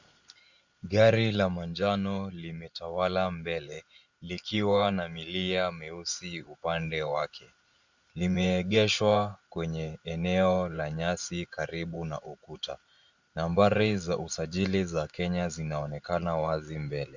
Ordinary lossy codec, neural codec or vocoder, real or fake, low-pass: Opus, 64 kbps; vocoder, 44.1 kHz, 128 mel bands every 512 samples, BigVGAN v2; fake; 7.2 kHz